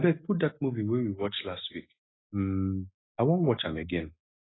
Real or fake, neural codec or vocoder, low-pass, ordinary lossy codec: real; none; 7.2 kHz; AAC, 16 kbps